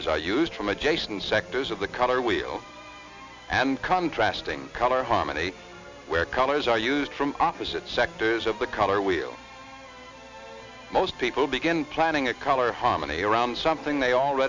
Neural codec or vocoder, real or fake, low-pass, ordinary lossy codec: none; real; 7.2 kHz; MP3, 64 kbps